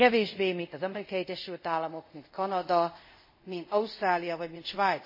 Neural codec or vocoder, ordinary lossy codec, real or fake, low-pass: codec, 24 kHz, 0.5 kbps, DualCodec; MP3, 24 kbps; fake; 5.4 kHz